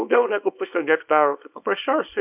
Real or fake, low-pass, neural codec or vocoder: fake; 3.6 kHz; codec, 24 kHz, 0.9 kbps, WavTokenizer, small release